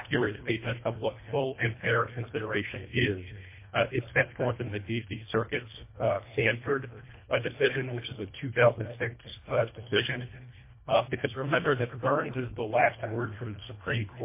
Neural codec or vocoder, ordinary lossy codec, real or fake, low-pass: codec, 24 kHz, 1.5 kbps, HILCodec; MP3, 24 kbps; fake; 3.6 kHz